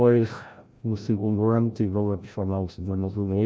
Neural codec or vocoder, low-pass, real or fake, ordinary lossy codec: codec, 16 kHz, 0.5 kbps, FreqCodec, larger model; none; fake; none